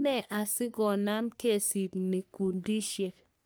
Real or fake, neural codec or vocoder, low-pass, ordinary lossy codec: fake; codec, 44.1 kHz, 3.4 kbps, Pupu-Codec; none; none